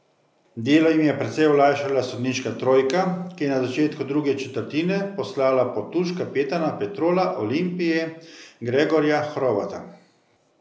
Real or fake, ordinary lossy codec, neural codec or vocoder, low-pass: real; none; none; none